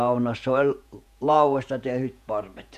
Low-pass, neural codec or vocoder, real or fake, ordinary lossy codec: 14.4 kHz; vocoder, 48 kHz, 128 mel bands, Vocos; fake; none